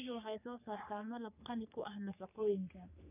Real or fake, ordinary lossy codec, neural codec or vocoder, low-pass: fake; none; codec, 44.1 kHz, 3.4 kbps, Pupu-Codec; 3.6 kHz